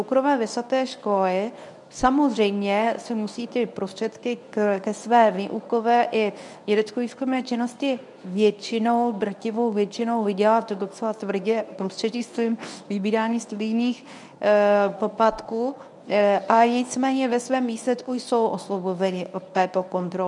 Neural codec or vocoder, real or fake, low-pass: codec, 24 kHz, 0.9 kbps, WavTokenizer, medium speech release version 1; fake; 10.8 kHz